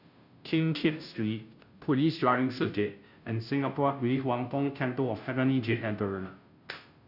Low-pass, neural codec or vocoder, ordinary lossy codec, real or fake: 5.4 kHz; codec, 16 kHz, 0.5 kbps, FunCodec, trained on Chinese and English, 25 frames a second; none; fake